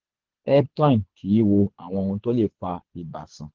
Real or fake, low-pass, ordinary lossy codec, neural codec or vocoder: fake; 7.2 kHz; Opus, 16 kbps; codec, 24 kHz, 6 kbps, HILCodec